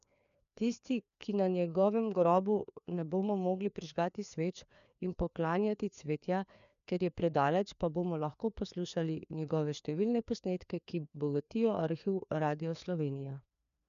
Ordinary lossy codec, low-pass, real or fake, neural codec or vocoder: none; 7.2 kHz; fake; codec, 16 kHz, 2 kbps, FreqCodec, larger model